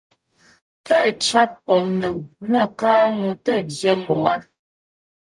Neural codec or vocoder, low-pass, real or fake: codec, 44.1 kHz, 0.9 kbps, DAC; 10.8 kHz; fake